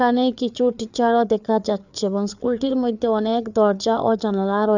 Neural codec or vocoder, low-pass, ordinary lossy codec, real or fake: codec, 16 kHz, 4 kbps, FreqCodec, larger model; 7.2 kHz; none; fake